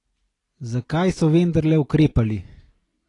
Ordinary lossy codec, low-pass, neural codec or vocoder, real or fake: AAC, 32 kbps; 10.8 kHz; none; real